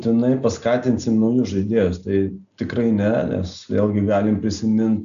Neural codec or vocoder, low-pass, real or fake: none; 7.2 kHz; real